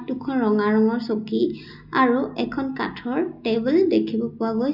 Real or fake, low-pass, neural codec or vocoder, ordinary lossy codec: real; 5.4 kHz; none; none